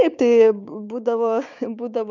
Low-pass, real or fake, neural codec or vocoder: 7.2 kHz; fake; codec, 16 kHz, 6 kbps, DAC